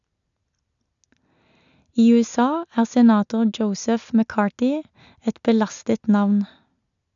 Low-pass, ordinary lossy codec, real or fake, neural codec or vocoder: 7.2 kHz; none; real; none